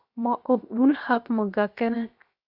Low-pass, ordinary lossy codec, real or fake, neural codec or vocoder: 5.4 kHz; AAC, 48 kbps; fake; codec, 16 kHz, 0.7 kbps, FocalCodec